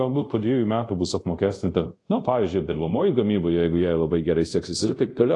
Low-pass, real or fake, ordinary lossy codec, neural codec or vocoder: 10.8 kHz; fake; AAC, 48 kbps; codec, 24 kHz, 0.5 kbps, DualCodec